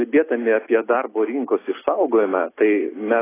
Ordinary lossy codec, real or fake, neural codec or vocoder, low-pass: AAC, 16 kbps; real; none; 3.6 kHz